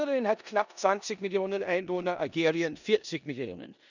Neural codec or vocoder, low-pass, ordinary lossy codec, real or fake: codec, 16 kHz in and 24 kHz out, 0.9 kbps, LongCat-Audio-Codec, four codebook decoder; 7.2 kHz; none; fake